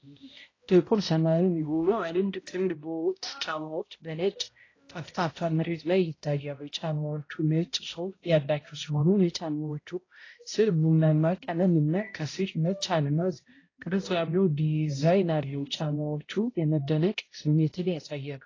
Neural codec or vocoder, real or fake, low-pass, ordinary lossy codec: codec, 16 kHz, 0.5 kbps, X-Codec, HuBERT features, trained on balanced general audio; fake; 7.2 kHz; AAC, 32 kbps